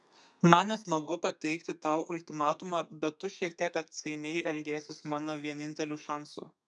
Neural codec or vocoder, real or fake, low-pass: codec, 32 kHz, 1.9 kbps, SNAC; fake; 10.8 kHz